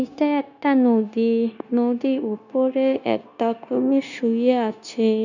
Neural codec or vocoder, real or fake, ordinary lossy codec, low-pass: codec, 16 kHz, 0.9 kbps, LongCat-Audio-Codec; fake; none; 7.2 kHz